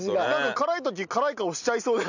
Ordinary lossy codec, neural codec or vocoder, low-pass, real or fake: none; none; 7.2 kHz; real